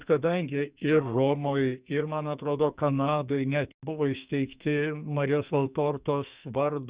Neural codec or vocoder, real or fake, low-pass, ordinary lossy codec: codec, 44.1 kHz, 2.6 kbps, SNAC; fake; 3.6 kHz; Opus, 64 kbps